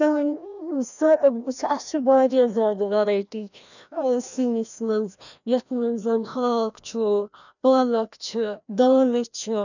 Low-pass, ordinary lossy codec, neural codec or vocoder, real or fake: 7.2 kHz; none; codec, 16 kHz, 1 kbps, FreqCodec, larger model; fake